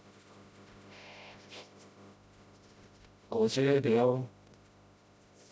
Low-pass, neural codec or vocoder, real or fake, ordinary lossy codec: none; codec, 16 kHz, 0.5 kbps, FreqCodec, smaller model; fake; none